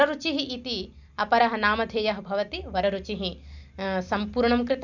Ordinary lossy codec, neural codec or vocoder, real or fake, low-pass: none; none; real; 7.2 kHz